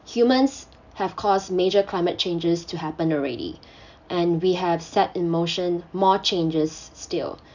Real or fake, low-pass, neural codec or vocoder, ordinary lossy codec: real; 7.2 kHz; none; none